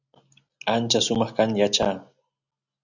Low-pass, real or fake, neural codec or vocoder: 7.2 kHz; real; none